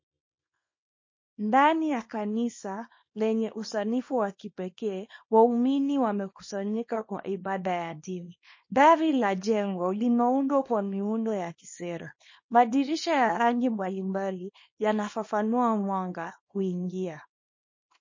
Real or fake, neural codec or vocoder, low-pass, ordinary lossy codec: fake; codec, 24 kHz, 0.9 kbps, WavTokenizer, small release; 7.2 kHz; MP3, 32 kbps